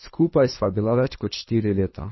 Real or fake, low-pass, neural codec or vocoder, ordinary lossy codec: fake; 7.2 kHz; codec, 24 kHz, 3 kbps, HILCodec; MP3, 24 kbps